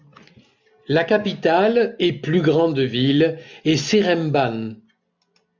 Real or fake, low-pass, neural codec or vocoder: real; 7.2 kHz; none